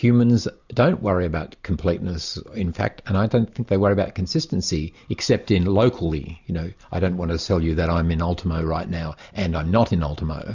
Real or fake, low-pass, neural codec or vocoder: real; 7.2 kHz; none